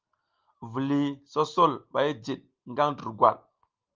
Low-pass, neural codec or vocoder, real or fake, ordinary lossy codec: 7.2 kHz; none; real; Opus, 24 kbps